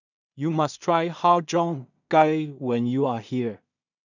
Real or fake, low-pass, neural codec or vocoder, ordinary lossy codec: fake; 7.2 kHz; codec, 16 kHz in and 24 kHz out, 0.4 kbps, LongCat-Audio-Codec, two codebook decoder; none